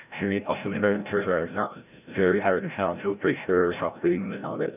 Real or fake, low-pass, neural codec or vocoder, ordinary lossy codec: fake; 3.6 kHz; codec, 16 kHz, 0.5 kbps, FreqCodec, larger model; none